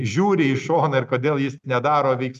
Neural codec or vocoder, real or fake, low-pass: none; real; 14.4 kHz